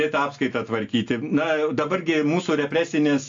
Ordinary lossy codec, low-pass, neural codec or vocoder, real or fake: AAC, 48 kbps; 7.2 kHz; none; real